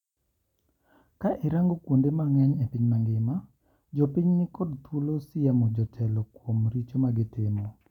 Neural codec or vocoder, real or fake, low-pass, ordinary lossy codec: none; real; 19.8 kHz; none